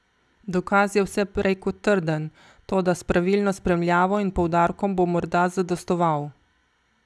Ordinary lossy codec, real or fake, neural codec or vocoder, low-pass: none; real; none; none